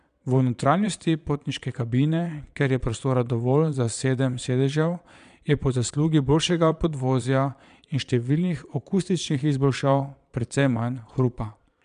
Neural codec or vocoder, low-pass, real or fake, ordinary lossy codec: vocoder, 22.05 kHz, 80 mel bands, Vocos; 9.9 kHz; fake; none